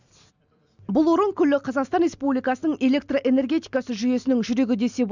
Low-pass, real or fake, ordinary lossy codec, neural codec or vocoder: 7.2 kHz; real; none; none